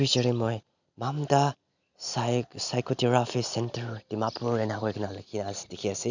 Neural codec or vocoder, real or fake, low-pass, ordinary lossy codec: none; real; 7.2 kHz; none